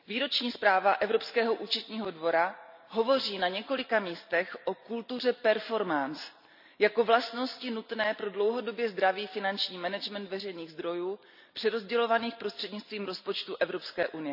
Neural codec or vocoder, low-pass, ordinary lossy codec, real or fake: none; 5.4 kHz; none; real